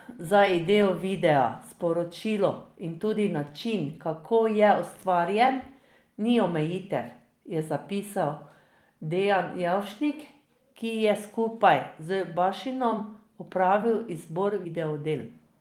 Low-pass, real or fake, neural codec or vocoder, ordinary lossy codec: 19.8 kHz; fake; vocoder, 44.1 kHz, 128 mel bands every 256 samples, BigVGAN v2; Opus, 24 kbps